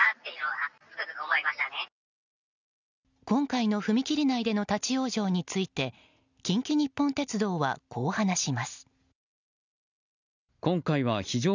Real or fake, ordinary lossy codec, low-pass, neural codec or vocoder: real; none; 7.2 kHz; none